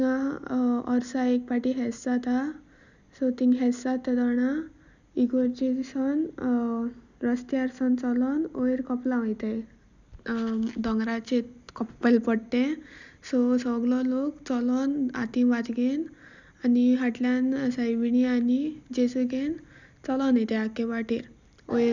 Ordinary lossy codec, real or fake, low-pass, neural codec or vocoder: none; real; 7.2 kHz; none